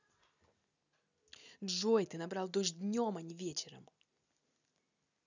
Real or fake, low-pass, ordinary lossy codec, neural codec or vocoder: real; 7.2 kHz; none; none